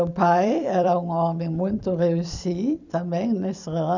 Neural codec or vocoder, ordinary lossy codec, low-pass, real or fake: vocoder, 44.1 kHz, 128 mel bands every 256 samples, BigVGAN v2; none; 7.2 kHz; fake